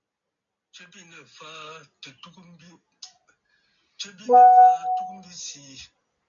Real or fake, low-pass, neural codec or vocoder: real; 7.2 kHz; none